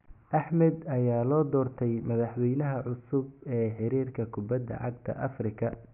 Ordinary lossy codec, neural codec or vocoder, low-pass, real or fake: none; none; 3.6 kHz; real